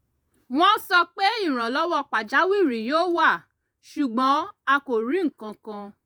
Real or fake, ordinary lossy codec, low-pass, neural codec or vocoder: fake; none; none; vocoder, 48 kHz, 128 mel bands, Vocos